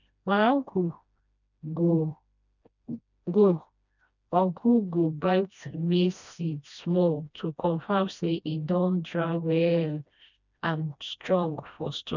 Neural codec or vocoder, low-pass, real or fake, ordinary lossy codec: codec, 16 kHz, 1 kbps, FreqCodec, smaller model; 7.2 kHz; fake; none